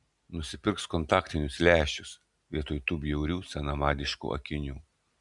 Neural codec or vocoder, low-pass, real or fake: none; 10.8 kHz; real